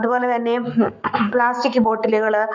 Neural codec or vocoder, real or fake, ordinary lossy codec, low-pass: codec, 44.1 kHz, 7.8 kbps, Pupu-Codec; fake; none; 7.2 kHz